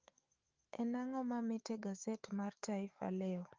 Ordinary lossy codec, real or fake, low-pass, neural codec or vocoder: Opus, 24 kbps; fake; 7.2 kHz; autoencoder, 48 kHz, 128 numbers a frame, DAC-VAE, trained on Japanese speech